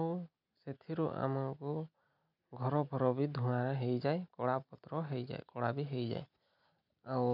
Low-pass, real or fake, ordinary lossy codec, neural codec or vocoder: 5.4 kHz; real; none; none